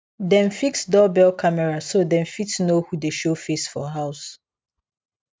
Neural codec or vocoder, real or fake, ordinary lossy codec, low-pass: none; real; none; none